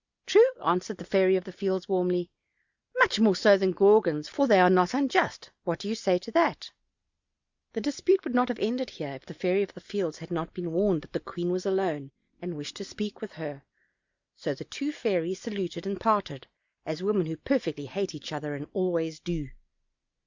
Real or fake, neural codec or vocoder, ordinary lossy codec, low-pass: real; none; Opus, 64 kbps; 7.2 kHz